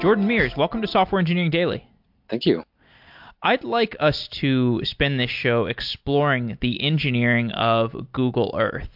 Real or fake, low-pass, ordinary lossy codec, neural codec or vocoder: real; 5.4 kHz; MP3, 48 kbps; none